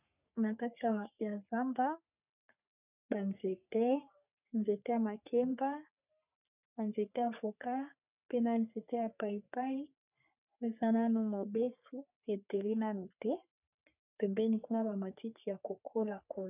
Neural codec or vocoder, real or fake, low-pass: codec, 44.1 kHz, 3.4 kbps, Pupu-Codec; fake; 3.6 kHz